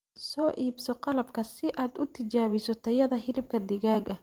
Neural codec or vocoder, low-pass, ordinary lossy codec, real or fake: vocoder, 44.1 kHz, 128 mel bands every 512 samples, BigVGAN v2; 19.8 kHz; Opus, 32 kbps; fake